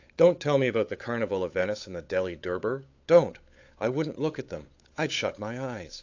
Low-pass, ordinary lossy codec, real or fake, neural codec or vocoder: 7.2 kHz; AAC, 48 kbps; fake; codec, 16 kHz, 8 kbps, FunCodec, trained on Chinese and English, 25 frames a second